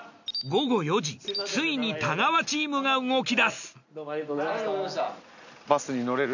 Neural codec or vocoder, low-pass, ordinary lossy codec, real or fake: none; 7.2 kHz; none; real